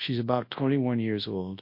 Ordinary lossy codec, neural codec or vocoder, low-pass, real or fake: MP3, 32 kbps; codec, 24 kHz, 0.9 kbps, WavTokenizer, large speech release; 5.4 kHz; fake